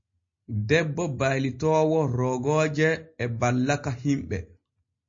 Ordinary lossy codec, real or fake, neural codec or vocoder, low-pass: MP3, 32 kbps; real; none; 7.2 kHz